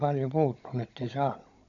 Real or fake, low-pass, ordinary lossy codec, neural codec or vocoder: fake; 7.2 kHz; none; codec, 16 kHz, 16 kbps, FunCodec, trained on Chinese and English, 50 frames a second